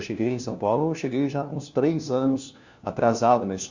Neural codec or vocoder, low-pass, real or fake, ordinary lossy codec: codec, 16 kHz, 1 kbps, FunCodec, trained on LibriTTS, 50 frames a second; 7.2 kHz; fake; Opus, 64 kbps